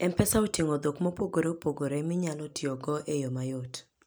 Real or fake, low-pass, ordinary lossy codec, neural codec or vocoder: real; none; none; none